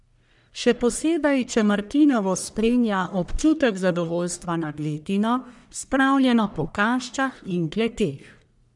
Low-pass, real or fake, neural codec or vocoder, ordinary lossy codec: 10.8 kHz; fake; codec, 44.1 kHz, 1.7 kbps, Pupu-Codec; none